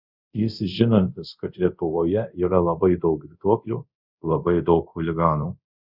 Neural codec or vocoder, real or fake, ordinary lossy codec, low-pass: codec, 24 kHz, 0.5 kbps, DualCodec; fake; Opus, 64 kbps; 5.4 kHz